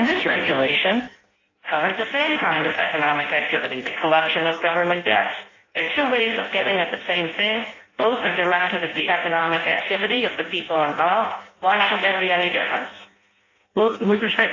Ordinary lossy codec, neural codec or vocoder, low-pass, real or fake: AAC, 32 kbps; codec, 16 kHz in and 24 kHz out, 0.6 kbps, FireRedTTS-2 codec; 7.2 kHz; fake